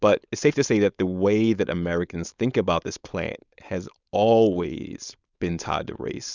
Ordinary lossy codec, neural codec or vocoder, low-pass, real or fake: Opus, 64 kbps; codec, 16 kHz, 4.8 kbps, FACodec; 7.2 kHz; fake